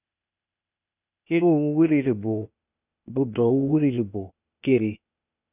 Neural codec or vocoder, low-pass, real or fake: codec, 16 kHz, 0.8 kbps, ZipCodec; 3.6 kHz; fake